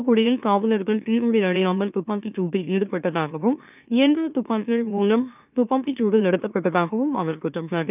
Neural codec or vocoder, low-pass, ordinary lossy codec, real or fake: autoencoder, 44.1 kHz, a latent of 192 numbers a frame, MeloTTS; 3.6 kHz; none; fake